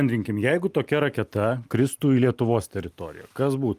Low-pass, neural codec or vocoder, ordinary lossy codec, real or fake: 14.4 kHz; none; Opus, 32 kbps; real